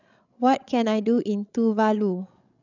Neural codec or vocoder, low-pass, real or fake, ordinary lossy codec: codec, 16 kHz, 16 kbps, FreqCodec, larger model; 7.2 kHz; fake; none